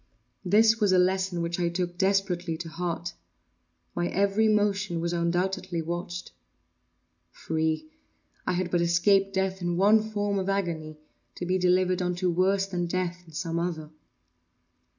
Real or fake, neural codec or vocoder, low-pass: real; none; 7.2 kHz